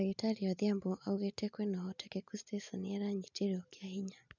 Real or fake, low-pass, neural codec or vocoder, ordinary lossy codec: real; 7.2 kHz; none; none